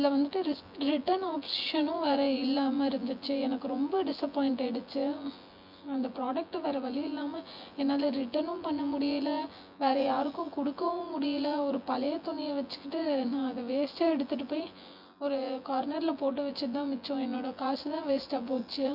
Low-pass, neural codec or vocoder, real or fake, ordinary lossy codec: 5.4 kHz; vocoder, 24 kHz, 100 mel bands, Vocos; fake; none